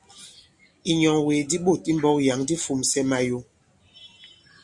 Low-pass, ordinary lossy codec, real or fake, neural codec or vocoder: 10.8 kHz; Opus, 64 kbps; real; none